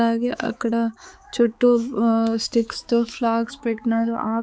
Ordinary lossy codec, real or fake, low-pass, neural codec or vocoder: none; fake; none; codec, 16 kHz, 4 kbps, X-Codec, HuBERT features, trained on balanced general audio